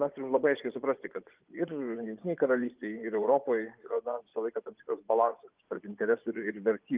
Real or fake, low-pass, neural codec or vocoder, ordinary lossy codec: fake; 3.6 kHz; vocoder, 24 kHz, 100 mel bands, Vocos; Opus, 32 kbps